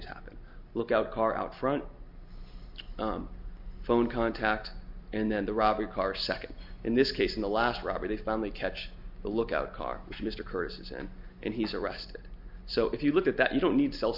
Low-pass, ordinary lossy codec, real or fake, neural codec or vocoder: 5.4 kHz; MP3, 48 kbps; real; none